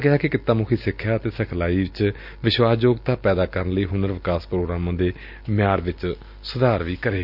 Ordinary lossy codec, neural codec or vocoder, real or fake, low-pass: none; none; real; 5.4 kHz